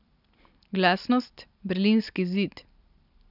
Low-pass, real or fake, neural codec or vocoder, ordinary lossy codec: 5.4 kHz; real; none; none